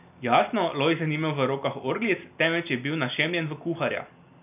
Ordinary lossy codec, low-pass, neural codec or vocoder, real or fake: none; 3.6 kHz; none; real